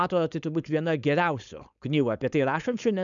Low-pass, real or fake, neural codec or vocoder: 7.2 kHz; fake; codec, 16 kHz, 4.8 kbps, FACodec